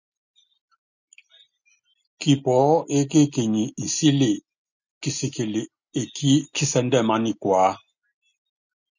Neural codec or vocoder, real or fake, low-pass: none; real; 7.2 kHz